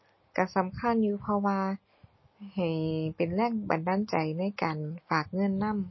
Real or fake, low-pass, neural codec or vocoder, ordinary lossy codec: real; 7.2 kHz; none; MP3, 24 kbps